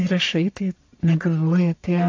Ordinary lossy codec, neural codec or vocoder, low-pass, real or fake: AAC, 48 kbps; codec, 44.1 kHz, 1.7 kbps, Pupu-Codec; 7.2 kHz; fake